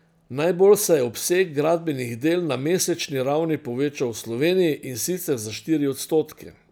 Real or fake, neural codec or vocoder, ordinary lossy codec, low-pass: real; none; none; none